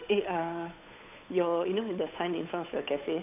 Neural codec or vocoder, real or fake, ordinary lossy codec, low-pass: codec, 16 kHz, 8 kbps, FunCodec, trained on Chinese and English, 25 frames a second; fake; none; 3.6 kHz